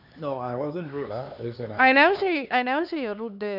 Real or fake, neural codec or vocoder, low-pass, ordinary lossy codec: fake; codec, 16 kHz, 4 kbps, X-Codec, HuBERT features, trained on LibriSpeech; 5.4 kHz; none